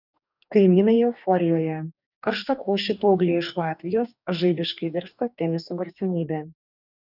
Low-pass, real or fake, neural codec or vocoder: 5.4 kHz; fake; codec, 44.1 kHz, 2.6 kbps, DAC